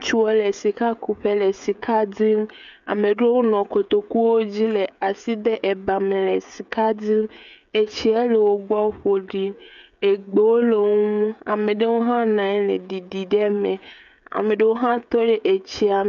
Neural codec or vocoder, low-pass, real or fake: codec, 16 kHz, 16 kbps, FreqCodec, smaller model; 7.2 kHz; fake